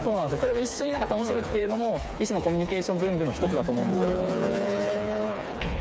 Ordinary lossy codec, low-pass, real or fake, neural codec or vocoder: none; none; fake; codec, 16 kHz, 4 kbps, FreqCodec, smaller model